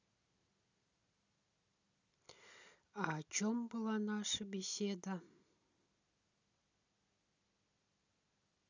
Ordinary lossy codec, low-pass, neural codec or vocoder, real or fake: none; 7.2 kHz; none; real